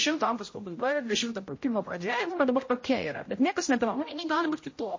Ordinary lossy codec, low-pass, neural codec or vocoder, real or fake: MP3, 32 kbps; 7.2 kHz; codec, 16 kHz, 0.5 kbps, X-Codec, HuBERT features, trained on general audio; fake